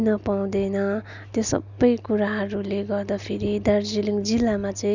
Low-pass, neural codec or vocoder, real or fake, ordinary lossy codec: 7.2 kHz; none; real; none